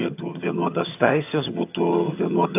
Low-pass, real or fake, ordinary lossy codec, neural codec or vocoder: 3.6 kHz; fake; AAC, 32 kbps; vocoder, 22.05 kHz, 80 mel bands, HiFi-GAN